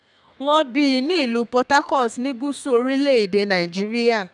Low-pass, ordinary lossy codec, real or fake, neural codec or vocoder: 10.8 kHz; none; fake; codec, 32 kHz, 1.9 kbps, SNAC